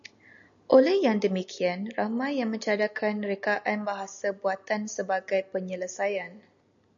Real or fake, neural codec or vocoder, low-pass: real; none; 7.2 kHz